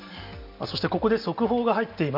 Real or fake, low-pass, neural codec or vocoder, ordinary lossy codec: real; 5.4 kHz; none; none